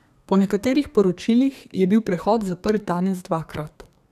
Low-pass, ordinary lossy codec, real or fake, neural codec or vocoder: 14.4 kHz; none; fake; codec, 32 kHz, 1.9 kbps, SNAC